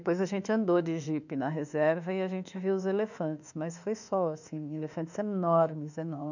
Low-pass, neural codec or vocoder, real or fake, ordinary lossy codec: 7.2 kHz; autoencoder, 48 kHz, 32 numbers a frame, DAC-VAE, trained on Japanese speech; fake; none